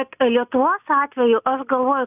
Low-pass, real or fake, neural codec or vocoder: 3.6 kHz; real; none